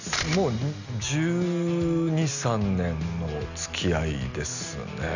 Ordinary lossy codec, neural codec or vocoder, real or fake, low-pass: none; none; real; 7.2 kHz